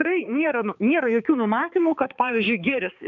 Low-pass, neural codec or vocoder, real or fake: 7.2 kHz; codec, 16 kHz, 4 kbps, X-Codec, HuBERT features, trained on balanced general audio; fake